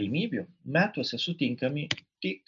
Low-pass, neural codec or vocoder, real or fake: 7.2 kHz; none; real